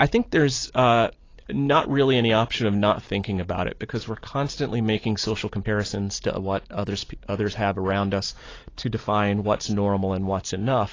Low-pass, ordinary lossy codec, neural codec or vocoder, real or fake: 7.2 kHz; AAC, 32 kbps; none; real